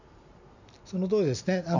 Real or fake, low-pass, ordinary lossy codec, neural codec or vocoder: real; 7.2 kHz; none; none